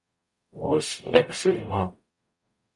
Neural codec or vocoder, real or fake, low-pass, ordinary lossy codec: codec, 44.1 kHz, 0.9 kbps, DAC; fake; 10.8 kHz; MP3, 48 kbps